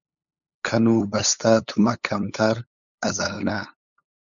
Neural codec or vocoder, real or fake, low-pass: codec, 16 kHz, 8 kbps, FunCodec, trained on LibriTTS, 25 frames a second; fake; 7.2 kHz